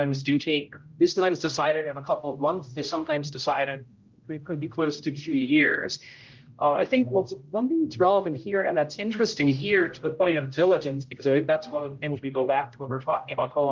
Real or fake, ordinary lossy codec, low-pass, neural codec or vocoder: fake; Opus, 32 kbps; 7.2 kHz; codec, 16 kHz, 0.5 kbps, X-Codec, HuBERT features, trained on general audio